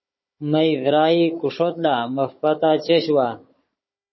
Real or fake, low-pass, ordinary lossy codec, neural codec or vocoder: fake; 7.2 kHz; MP3, 24 kbps; codec, 16 kHz, 16 kbps, FunCodec, trained on Chinese and English, 50 frames a second